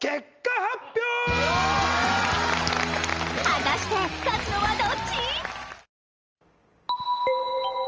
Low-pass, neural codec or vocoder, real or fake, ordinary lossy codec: 7.2 kHz; none; real; Opus, 24 kbps